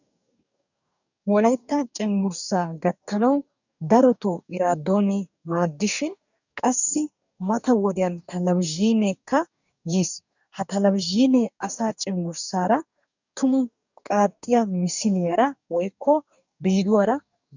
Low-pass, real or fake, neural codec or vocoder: 7.2 kHz; fake; codec, 44.1 kHz, 2.6 kbps, DAC